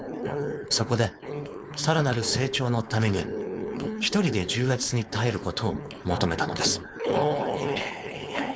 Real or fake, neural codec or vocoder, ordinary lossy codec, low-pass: fake; codec, 16 kHz, 4.8 kbps, FACodec; none; none